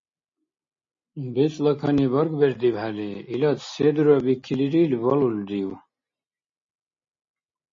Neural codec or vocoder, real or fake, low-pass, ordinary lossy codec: none; real; 7.2 kHz; MP3, 32 kbps